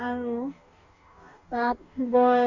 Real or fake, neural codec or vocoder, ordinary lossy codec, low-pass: fake; codec, 44.1 kHz, 2.6 kbps, DAC; Opus, 64 kbps; 7.2 kHz